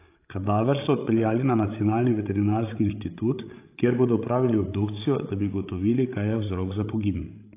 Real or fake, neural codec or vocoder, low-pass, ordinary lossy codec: fake; codec, 16 kHz, 16 kbps, FreqCodec, larger model; 3.6 kHz; AAC, 24 kbps